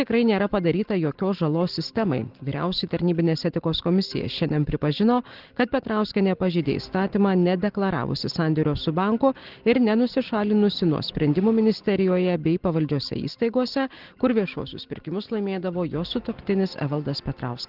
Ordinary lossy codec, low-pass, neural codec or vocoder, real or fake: Opus, 16 kbps; 5.4 kHz; none; real